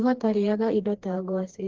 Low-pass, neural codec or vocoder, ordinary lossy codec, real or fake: 7.2 kHz; codec, 16 kHz, 2 kbps, FreqCodec, smaller model; Opus, 16 kbps; fake